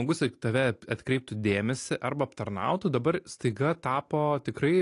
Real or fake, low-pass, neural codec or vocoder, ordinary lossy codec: real; 10.8 kHz; none; AAC, 48 kbps